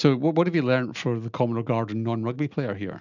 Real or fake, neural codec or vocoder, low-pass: real; none; 7.2 kHz